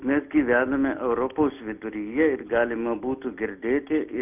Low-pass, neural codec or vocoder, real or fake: 3.6 kHz; none; real